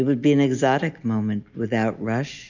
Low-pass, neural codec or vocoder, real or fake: 7.2 kHz; none; real